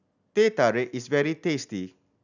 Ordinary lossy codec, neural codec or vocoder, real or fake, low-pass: none; none; real; 7.2 kHz